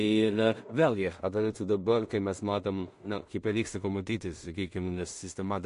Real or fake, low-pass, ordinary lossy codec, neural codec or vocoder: fake; 10.8 kHz; MP3, 48 kbps; codec, 16 kHz in and 24 kHz out, 0.4 kbps, LongCat-Audio-Codec, two codebook decoder